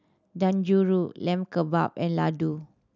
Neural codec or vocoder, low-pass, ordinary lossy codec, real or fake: none; 7.2 kHz; none; real